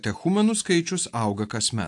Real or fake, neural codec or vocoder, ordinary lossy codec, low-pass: real; none; AAC, 64 kbps; 10.8 kHz